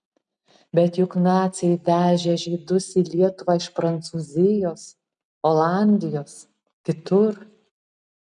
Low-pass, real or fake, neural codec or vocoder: 10.8 kHz; real; none